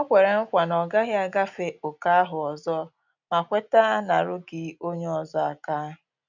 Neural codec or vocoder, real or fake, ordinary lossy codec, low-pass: none; real; none; 7.2 kHz